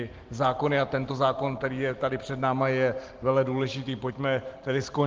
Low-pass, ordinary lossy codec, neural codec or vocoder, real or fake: 7.2 kHz; Opus, 16 kbps; none; real